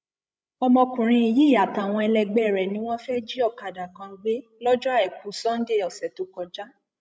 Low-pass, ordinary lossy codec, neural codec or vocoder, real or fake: none; none; codec, 16 kHz, 16 kbps, FreqCodec, larger model; fake